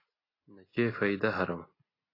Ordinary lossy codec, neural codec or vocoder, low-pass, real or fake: AAC, 24 kbps; none; 5.4 kHz; real